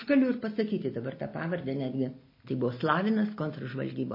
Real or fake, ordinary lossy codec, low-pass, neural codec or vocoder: real; MP3, 24 kbps; 5.4 kHz; none